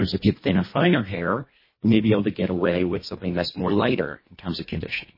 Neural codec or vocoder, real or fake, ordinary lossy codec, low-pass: codec, 24 kHz, 1.5 kbps, HILCodec; fake; MP3, 24 kbps; 5.4 kHz